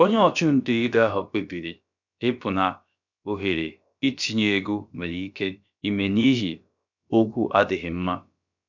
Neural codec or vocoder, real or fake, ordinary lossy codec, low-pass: codec, 16 kHz, about 1 kbps, DyCAST, with the encoder's durations; fake; none; 7.2 kHz